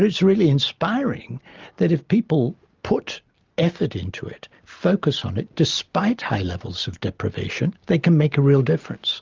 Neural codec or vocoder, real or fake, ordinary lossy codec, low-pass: none; real; Opus, 32 kbps; 7.2 kHz